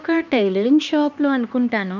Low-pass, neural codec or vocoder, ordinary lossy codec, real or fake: 7.2 kHz; codec, 16 kHz, 2 kbps, X-Codec, HuBERT features, trained on LibriSpeech; none; fake